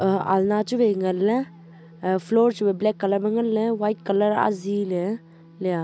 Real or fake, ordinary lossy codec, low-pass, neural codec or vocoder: real; none; none; none